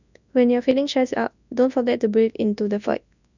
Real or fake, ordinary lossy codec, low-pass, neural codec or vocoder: fake; none; 7.2 kHz; codec, 24 kHz, 0.9 kbps, WavTokenizer, large speech release